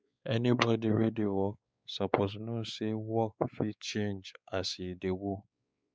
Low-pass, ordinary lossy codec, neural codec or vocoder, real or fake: none; none; codec, 16 kHz, 4 kbps, X-Codec, WavLM features, trained on Multilingual LibriSpeech; fake